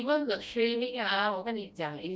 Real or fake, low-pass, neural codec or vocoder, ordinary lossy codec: fake; none; codec, 16 kHz, 1 kbps, FreqCodec, smaller model; none